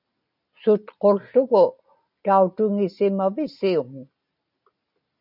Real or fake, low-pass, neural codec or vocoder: real; 5.4 kHz; none